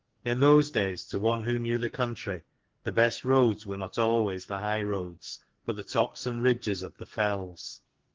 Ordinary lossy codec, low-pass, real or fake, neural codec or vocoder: Opus, 16 kbps; 7.2 kHz; fake; codec, 44.1 kHz, 2.6 kbps, SNAC